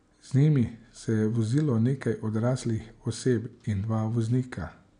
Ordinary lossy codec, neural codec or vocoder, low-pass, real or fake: none; none; 9.9 kHz; real